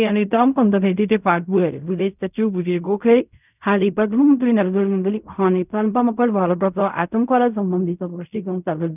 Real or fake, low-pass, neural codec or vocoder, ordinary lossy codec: fake; 3.6 kHz; codec, 16 kHz in and 24 kHz out, 0.4 kbps, LongCat-Audio-Codec, fine tuned four codebook decoder; none